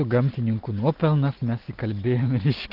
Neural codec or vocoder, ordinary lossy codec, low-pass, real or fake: none; Opus, 24 kbps; 5.4 kHz; real